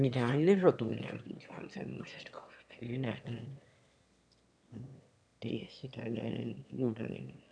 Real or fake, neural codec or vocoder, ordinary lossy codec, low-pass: fake; autoencoder, 22.05 kHz, a latent of 192 numbers a frame, VITS, trained on one speaker; none; 9.9 kHz